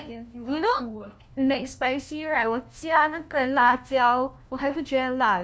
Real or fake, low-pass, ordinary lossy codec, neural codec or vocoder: fake; none; none; codec, 16 kHz, 1 kbps, FunCodec, trained on LibriTTS, 50 frames a second